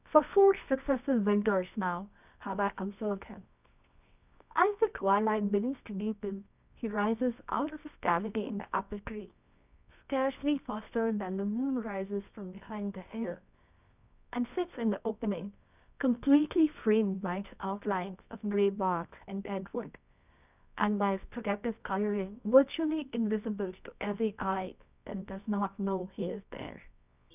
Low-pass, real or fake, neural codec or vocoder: 3.6 kHz; fake; codec, 24 kHz, 0.9 kbps, WavTokenizer, medium music audio release